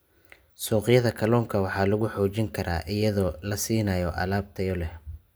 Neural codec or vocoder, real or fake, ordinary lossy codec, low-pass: none; real; none; none